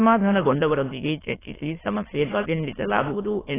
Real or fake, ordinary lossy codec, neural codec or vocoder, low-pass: fake; AAC, 16 kbps; autoencoder, 22.05 kHz, a latent of 192 numbers a frame, VITS, trained on many speakers; 3.6 kHz